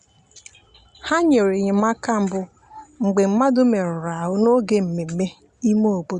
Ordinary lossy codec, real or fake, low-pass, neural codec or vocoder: Opus, 64 kbps; real; 9.9 kHz; none